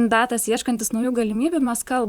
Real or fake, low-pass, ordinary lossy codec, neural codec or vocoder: fake; 19.8 kHz; Opus, 64 kbps; vocoder, 44.1 kHz, 128 mel bands, Pupu-Vocoder